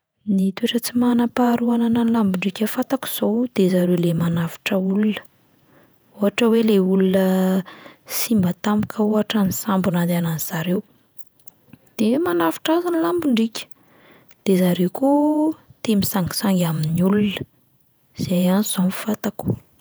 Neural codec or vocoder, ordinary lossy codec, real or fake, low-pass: vocoder, 48 kHz, 128 mel bands, Vocos; none; fake; none